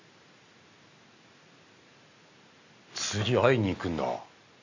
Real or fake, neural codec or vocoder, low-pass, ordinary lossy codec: real; none; 7.2 kHz; AAC, 48 kbps